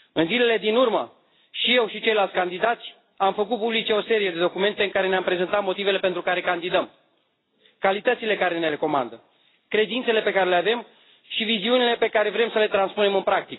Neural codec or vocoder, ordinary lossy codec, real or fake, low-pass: none; AAC, 16 kbps; real; 7.2 kHz